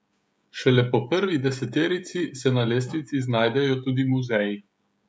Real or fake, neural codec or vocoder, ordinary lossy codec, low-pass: fake; codec, 16 kHz, 16 kbps, FreqCodec, smaller model; none; none